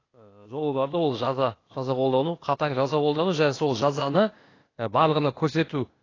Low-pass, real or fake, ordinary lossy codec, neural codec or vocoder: 7.2 kHz; fake; AAC, 32 kbps; codec, 16 kHz, 0.8 kbps, ZipCodec